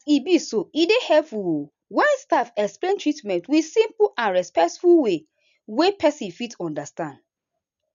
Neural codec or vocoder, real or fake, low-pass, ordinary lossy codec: none; real; 7.2 kHz; none